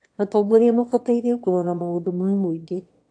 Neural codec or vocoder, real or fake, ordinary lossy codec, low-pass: autoencoder, 22.05 kHz, a latent of 192 numbers a frame, VITS, trained on one speaker; fake; AAC, 48 kbps; 9.9 kHz